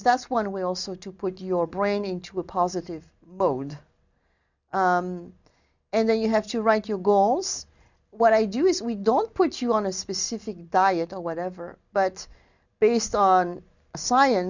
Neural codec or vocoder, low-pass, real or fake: none; 7.2 kHz; real